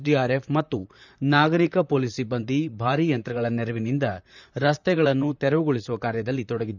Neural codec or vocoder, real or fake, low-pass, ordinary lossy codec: vocoder, 44.1 kHz, 128 mel bands, Pupu-Vocoder; fake; 7.2 kHz; none